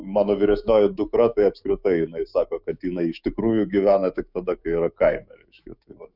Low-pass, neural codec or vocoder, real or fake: 5.4 kHz; autoencoder, 48 kHz, 128 numbers a frame, DAC-VAE, trained on Japanese speech; fake